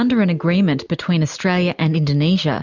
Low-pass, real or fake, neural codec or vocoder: 7.2 kHz; real; none